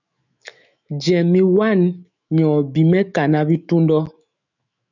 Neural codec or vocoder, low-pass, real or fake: autoencoder, 48 kHz, 128 numbers a frame, DAC-VAE, trained on Japanese speech; 7.2 kHz; fake